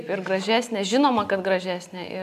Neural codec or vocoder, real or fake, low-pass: none; real; 14.4 kHz